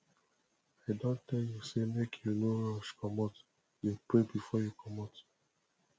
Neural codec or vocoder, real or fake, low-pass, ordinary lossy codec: none; real; none; none